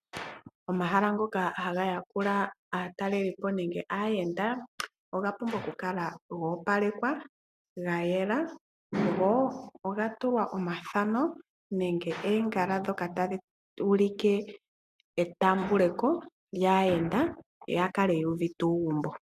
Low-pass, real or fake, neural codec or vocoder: 14.4 kHz; real; none